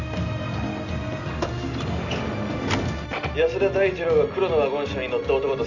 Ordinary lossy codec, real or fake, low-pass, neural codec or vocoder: none; real; 7.2 kHz; none